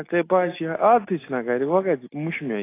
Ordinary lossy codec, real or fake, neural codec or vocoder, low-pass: AAC, 24 kbps; real; none; 3.6 kHz